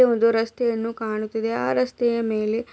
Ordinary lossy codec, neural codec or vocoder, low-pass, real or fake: none; none; none; real